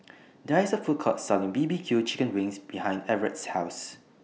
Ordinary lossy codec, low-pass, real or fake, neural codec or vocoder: none; none; real; none